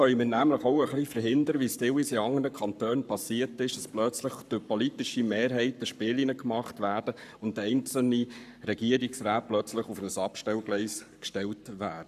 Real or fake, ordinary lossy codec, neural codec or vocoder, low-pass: fake; none; codec, 44.1 kHz, 7.8 kbps, Pupu-Codec; 14.4 kHz